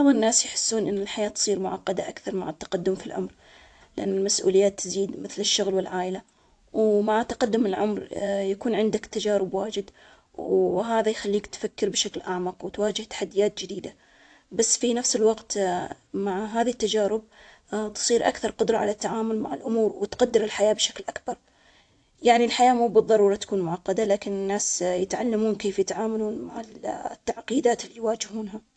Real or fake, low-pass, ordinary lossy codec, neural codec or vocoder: fake; 9.9 kHz; AAC, 64 kbps; vocoder, 24 kHz, 100 mel bands, Vocos